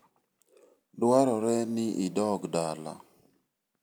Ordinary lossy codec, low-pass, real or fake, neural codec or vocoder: none; none; real; none